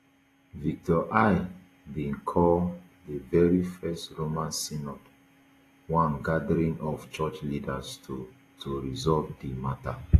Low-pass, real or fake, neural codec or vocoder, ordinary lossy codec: 14.4 kHz; real; none; AAC, 48 kbps